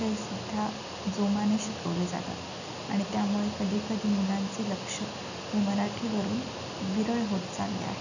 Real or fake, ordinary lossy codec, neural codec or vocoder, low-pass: real; none; none; 7.2 kHz